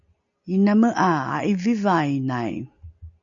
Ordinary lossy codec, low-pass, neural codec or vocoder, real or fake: AAC, 64 kbps; 7.2 kHz; none; real